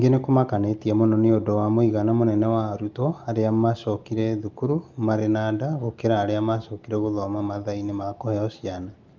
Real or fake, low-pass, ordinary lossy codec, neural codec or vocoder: real; 7.2 kHz; Opus, 32 kbps; none